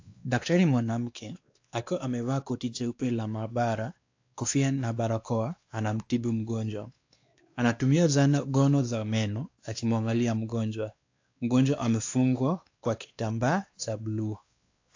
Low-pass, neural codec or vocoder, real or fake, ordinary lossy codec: 7.2 kHz; codec, 16 kHz, 2 kbps, X-Codec, WavLM features, trained on Multilingual LibriSpeech; fake; AAC, 48 kbps